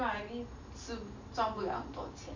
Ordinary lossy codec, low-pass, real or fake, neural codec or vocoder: AAC, 48 kbps; 7.2 kHz; real; none